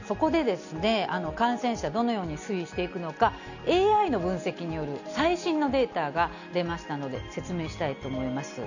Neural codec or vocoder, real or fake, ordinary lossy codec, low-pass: none; real; none; 7.2 kHz